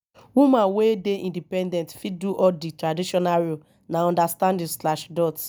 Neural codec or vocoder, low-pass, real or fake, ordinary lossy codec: none; none; real; none